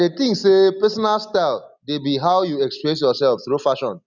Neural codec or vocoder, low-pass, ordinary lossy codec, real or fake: none; 7.2 kHz; none; real